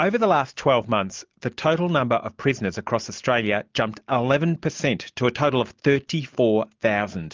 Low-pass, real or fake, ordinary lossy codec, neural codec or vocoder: 7.2 kHz; real; Opus, 24 kbps; none